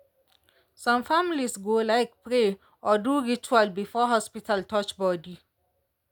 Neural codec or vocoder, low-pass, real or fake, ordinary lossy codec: none; none; real; none